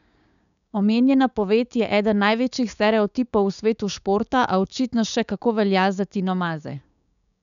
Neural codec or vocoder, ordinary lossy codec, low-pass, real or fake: codec, 16 kHz, 6 kbps, DAC; none; 7.2 kHz; fake